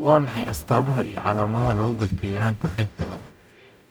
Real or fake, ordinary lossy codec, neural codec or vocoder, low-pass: fake; none; codec, 44.1 kHz, 0.9 kbps, DAC; none